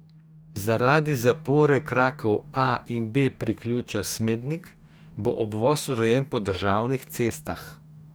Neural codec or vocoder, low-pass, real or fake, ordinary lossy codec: codec, 44.1 kHz, 2.6 kbps, DAC; none; fake; none